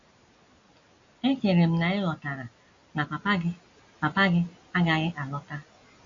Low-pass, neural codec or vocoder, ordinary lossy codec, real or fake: 7.2 kHz; none; AAC, 48 kbps; real